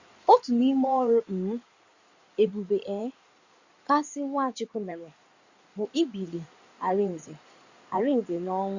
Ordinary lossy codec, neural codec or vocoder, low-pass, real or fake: Opus, 64 kbps; codec, 16 kHz in and 24 kHz out, 2.2 kbps, FireRedTTS-2 codec; 7.2 kHz; fake